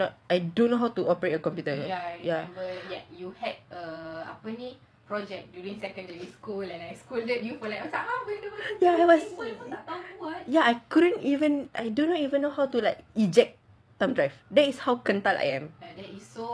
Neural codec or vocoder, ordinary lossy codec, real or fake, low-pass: vocoder, 22.05 kHz, 80 mel bands, WaveNeXt; none; fake; none